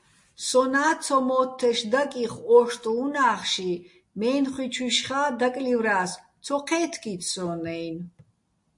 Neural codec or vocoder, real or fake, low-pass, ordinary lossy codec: none; real; 10.8 kHz; MP3, 48 kbps